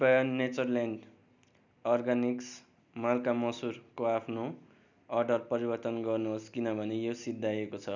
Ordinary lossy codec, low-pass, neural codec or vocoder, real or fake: none; 7.2 kHz; none; real